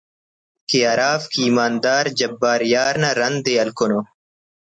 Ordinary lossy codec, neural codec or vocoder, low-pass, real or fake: MP3, 96 kbps; none; 9.9 kHz; real